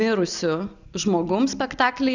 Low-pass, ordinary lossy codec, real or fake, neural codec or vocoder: 7.2 kHz; Opus, 64 kbps; real; none